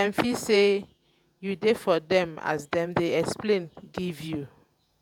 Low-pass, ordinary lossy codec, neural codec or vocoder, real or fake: 19.8 kHz; none; vocoder, 48 kHz, 128 mel bands, Vocos; fake